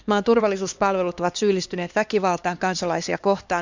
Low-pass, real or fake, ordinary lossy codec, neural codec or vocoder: 7.2 kHz; fake; Opus, 64 kbps; codec, 16 kHz, 2 kbps, X-Codec, HuBERT features, trained on LibriSpeech